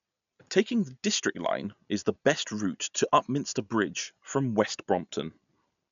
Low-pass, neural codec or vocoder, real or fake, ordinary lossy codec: 7.2 kHz; none; real; MP3, 96 kbps